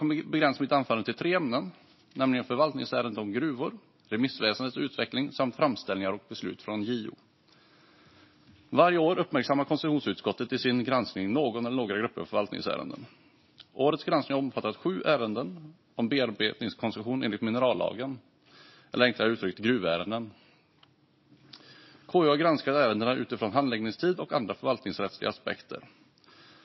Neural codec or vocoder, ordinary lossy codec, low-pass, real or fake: none; MP3, 24 kbps; 7.2 kHz; real